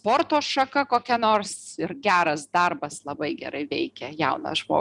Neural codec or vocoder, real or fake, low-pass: none; real; 10.8 kHz